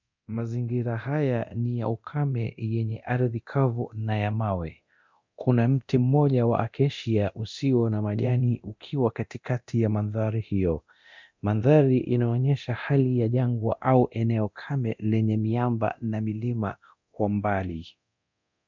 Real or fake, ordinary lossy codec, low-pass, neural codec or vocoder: fake; MP3, 64 kbps; 7.2 kHz; codec, 24 kHz, 0.9 kbps, DualCodec